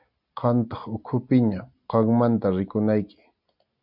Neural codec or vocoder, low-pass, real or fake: none; 5.4 kHz; real